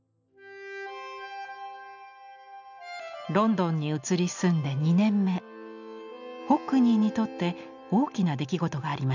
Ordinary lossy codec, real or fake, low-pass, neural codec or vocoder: none; real; 7.2 kHz; none